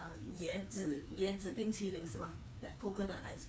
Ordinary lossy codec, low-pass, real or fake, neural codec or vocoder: none; none; fake; codec, 16 kHz, 2 kbps, FreqCodec, larger model